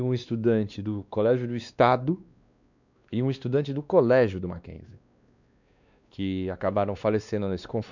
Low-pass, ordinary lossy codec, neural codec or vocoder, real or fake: 7.2 kHz; none; codec, 16 kHz, 2 kbps, X-Codec, WavLM features, trained on Multilingual LibriSpeech; fake